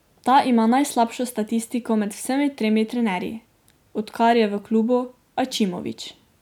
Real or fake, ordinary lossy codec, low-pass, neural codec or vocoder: real; none; 19.8 kHz; none